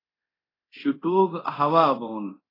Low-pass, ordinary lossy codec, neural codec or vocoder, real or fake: 5.4 kHz; AAC, 24 kbps; codec, 24 kHz, 0.9 kbps, DualCodec; fake